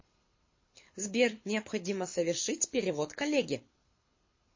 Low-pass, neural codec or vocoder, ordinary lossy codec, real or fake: 7.2 kHz; codec, 24 kHz, 6 kbps, HILCodec; MP3, 32 kbps; fake